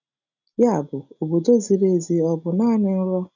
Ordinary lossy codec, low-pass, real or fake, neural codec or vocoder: none; 7.2 kHz; real; none